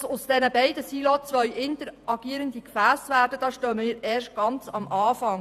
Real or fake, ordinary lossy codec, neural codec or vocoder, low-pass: fake; none; vocoder, 48 kHz, 128 mel bands, Vocos; 14.4 kHz